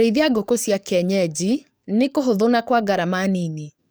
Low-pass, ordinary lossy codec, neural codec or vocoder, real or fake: none; none; codec, 44.1 kHz, 7.8 kbps, DAC; fake